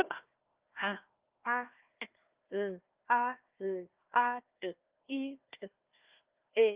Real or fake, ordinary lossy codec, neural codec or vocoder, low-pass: fake; Opus, 24 kbps; codec, 16 kHz, 1 kbps, FunCodec, trained on Chinese and English, 50 frames a second; 3.6 kHz